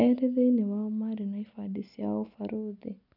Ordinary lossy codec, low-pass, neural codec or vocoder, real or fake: none; 5.4 kHz; none; real